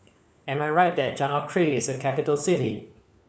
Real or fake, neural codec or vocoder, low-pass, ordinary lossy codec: fake; codec, 16 kHz, 4 kbps, FunCodec, trained on LibriTTS, 50 frames a second; none; none